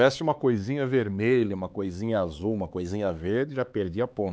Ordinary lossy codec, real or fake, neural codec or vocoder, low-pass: none; fake; codec, 16 kHz, 4 kbps, X-Codec, HuBERT features, trained on LibriSpeech; none